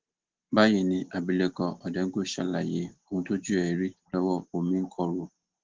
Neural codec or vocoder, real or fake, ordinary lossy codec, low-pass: none; real; Opus, 16 kbps; 7.2 kHz